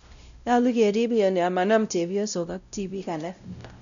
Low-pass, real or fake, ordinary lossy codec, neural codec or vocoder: 7.2 kHz; fake; none; codec, 16 kHz, 0.5 kbps, X-Codec, WavLM features, trained on Multilingual LibriSpeech